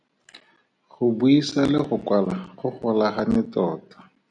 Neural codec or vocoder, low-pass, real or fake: none; 9.9 kHz; real